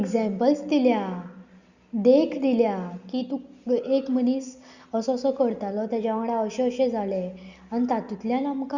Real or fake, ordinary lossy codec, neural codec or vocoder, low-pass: real; Opus, 64 kbps; none; 7.2 kHz